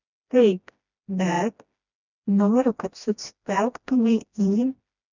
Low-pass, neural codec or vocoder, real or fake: 7.2 kHz; codec, 16 kHz, 1 kbps, FreqCodec, smaller model; fake